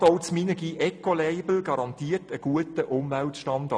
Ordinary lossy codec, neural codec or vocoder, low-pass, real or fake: none; none; none; real